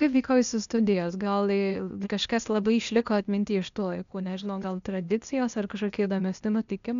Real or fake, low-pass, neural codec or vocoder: fake; 7.2 kHz; codec, 16 kHz, 0.8 kbps, ZipCodec